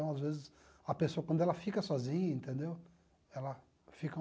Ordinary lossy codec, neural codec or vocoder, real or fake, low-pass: none; none; real; none